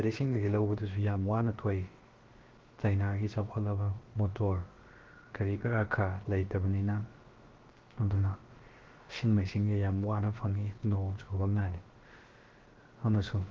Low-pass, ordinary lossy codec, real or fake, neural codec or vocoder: 7.2 kHz; Opus, 16 kbps; fake; codec, 16 kHz, about 1 kbps, DyCAST, with the encoder's durations